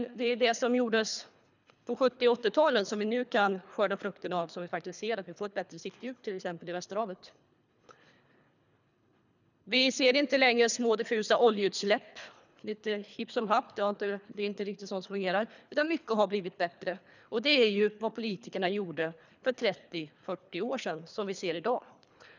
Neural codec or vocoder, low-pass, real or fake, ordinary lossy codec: codec, 24 kHz, 3 kbps, HILCodec; 7.2 kHz; fake; none